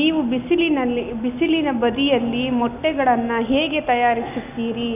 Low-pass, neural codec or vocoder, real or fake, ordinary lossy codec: 3.6 kHz; none; real; none